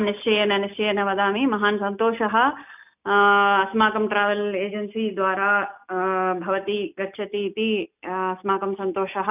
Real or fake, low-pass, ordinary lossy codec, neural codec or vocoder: real; 3.6 kHz; none; none